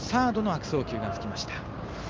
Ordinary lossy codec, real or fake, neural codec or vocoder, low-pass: Opus, 24 kbps; real; none; 7.2 kHz